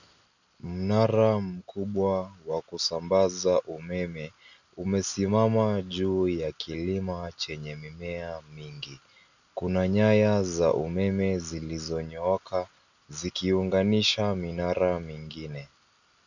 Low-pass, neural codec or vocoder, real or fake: 7.2 kHz; none; real